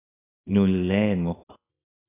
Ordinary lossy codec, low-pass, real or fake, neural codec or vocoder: AAC, 16 kbps; 3.6 kHz; fake; codec, 24 kHz, 0.9 kbps, WavTokenizer, small release